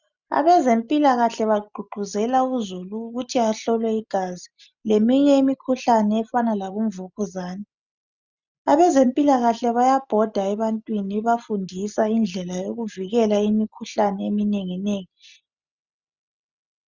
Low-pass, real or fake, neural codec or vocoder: 7.2 kHz; real; none